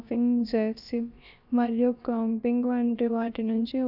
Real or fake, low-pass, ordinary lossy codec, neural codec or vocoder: fake; 5.4 kHz; none; codec, 16 kHz, about 1 kbps, DyCAST, with the encoder's durations